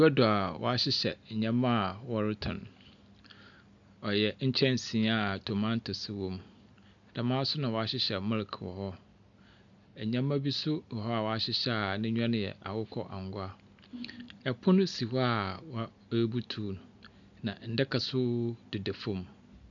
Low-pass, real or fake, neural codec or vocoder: 7.2 kHz; real; none